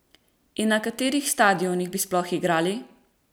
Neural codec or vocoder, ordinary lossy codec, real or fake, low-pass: none; none; real; none